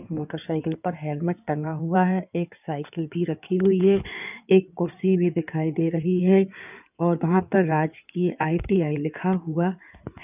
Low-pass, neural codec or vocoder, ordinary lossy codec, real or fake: 3.6 kHz; codec, 16 kHz in and 24 kHz out, 2.2 kbps, FireRedTTS-2 codec; none; fake